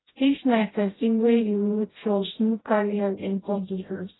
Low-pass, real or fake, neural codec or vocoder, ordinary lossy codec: 7.2 kHz; fake; codec, 16 kHz, 0.5 kbps, FreqCodec, smaller model; AAC, 16 kbps